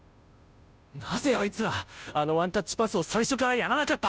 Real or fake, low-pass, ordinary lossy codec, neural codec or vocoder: fake; none; none; codec, 16 kHz, 0.5 kbps, FunCodec, trained on Chinese and English, 25 frames a second